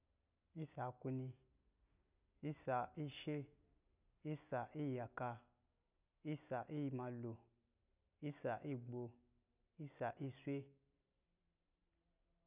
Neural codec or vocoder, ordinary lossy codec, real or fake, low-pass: none; none; real; 3.6 kHz